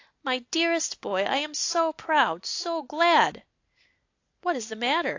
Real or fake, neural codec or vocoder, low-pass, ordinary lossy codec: real; none; 7.2 kHz; AAC, 48 kbps